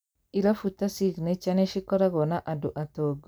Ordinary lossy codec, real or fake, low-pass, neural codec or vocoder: none; real; none; none